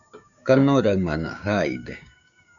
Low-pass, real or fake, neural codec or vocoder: 7.2 kHz; fake; codec, 16 kHz, 6 kbps, DAC